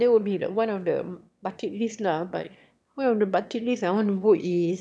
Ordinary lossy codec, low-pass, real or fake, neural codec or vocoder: none; none; fake; autoencoder, 22.05 kHz, a latent of 192 numbers a frame, VITS, trained on one speaker